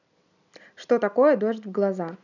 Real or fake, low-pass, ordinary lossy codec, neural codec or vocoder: real; 7.2 kHz; none; none